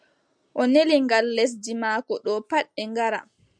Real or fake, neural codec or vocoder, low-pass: real; none; 9.9 kHz